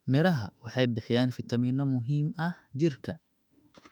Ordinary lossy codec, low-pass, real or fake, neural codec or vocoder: none; 19.8 kHz; fake; autoencoder, 48 kHz, 32 numbers a frame, DAC-VAE, trained on Japanese speech